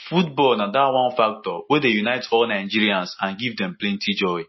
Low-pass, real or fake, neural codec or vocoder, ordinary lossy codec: 7.2 kHz; real; none; MP3, 24 kbps